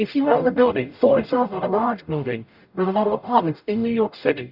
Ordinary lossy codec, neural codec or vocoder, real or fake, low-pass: Opus, 64 kbps; codec, 44.1 kHz, 0.9 kbps, DAC; fake; 5.4 kHz